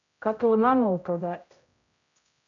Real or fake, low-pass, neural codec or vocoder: fake; 7.2 kHz; codec, 16 kHz, 0.5 kbps, X-Codec, HuBERT features, trained on general audio